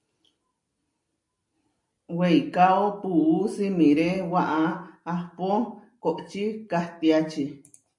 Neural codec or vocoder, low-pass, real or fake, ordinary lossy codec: none; 10.8 kHz; real; MP3, 64 kbps